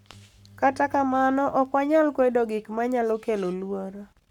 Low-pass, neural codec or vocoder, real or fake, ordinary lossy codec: 19.8 kHz; codec, 44.1 kHz, 7.8 kbps, Pupu-Codec; fake; none